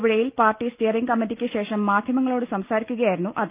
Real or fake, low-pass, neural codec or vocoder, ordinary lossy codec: real; 3.6 kHz; none; Opus, 24 kbps